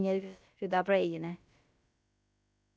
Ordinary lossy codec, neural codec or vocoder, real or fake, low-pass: none; codec, 16 kHz, about 1 kbps, DyCAST, with the encoder's durations; fake; none